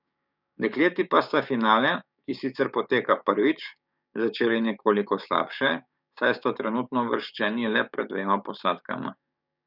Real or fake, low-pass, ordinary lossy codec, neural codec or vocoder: fake; 5.4 kHz; none; codec, 16 kHz, 6 kbps, DAC